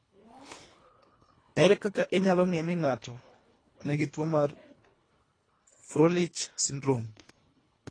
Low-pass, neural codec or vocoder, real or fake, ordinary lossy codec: 9.9 kHz; codec, 24 kHz, 1.5 kbps, HILCodec; fake; AAC, 32 kbps